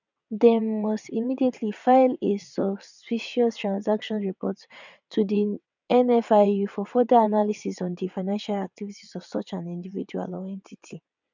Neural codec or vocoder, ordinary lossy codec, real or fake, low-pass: vocoder, 22.05 kHz, 80 mel bands, WaveNeXt; none; fake; 7.2 kHz